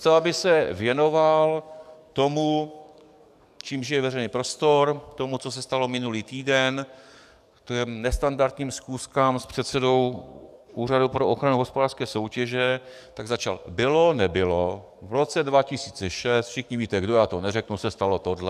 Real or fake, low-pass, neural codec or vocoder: fake; 14.4 kHz; codec, 44.1 kHz, 7.8 kbps, DAC